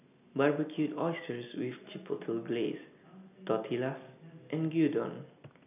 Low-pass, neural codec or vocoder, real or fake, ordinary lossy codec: 3.6 kHz; none; real; none